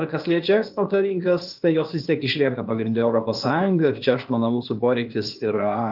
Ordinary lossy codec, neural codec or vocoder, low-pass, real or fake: Opus, 32 kbps; codec, 16 kHz, 0.8 kbps, ZipCodec; 5.4 kHz; fake